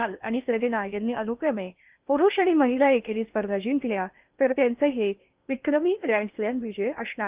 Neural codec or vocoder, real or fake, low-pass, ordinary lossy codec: codec, 16 kHz in and 24 kHz out, 0.6 kbps, FocalCodec, streaming, 4096 codes; fake; 3.6 kHz; Opus, 24 kbps